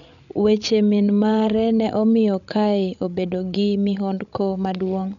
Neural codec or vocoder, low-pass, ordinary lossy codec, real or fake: codec, 16 kHz, 16 kbps, FreqCodec, larger model; 7.2 kHz; none; fake